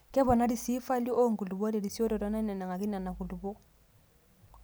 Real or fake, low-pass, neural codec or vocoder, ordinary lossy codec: real; none; none; none